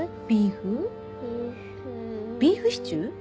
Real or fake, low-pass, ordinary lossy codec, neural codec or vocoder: real; none; none; none